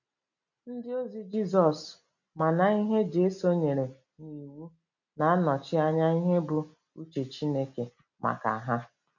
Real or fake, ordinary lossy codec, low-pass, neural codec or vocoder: real; none; 7.2 kHz; none